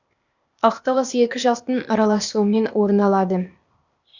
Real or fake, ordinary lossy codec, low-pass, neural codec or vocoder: fake; none; 7.2 kHz; codec, 16 kHz, 0.8 kbps, ZipCodec